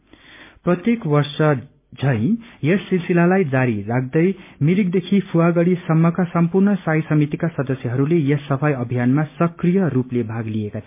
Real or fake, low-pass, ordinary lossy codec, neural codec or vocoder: real; 3.6 kHz; MP3, 32 kbps; none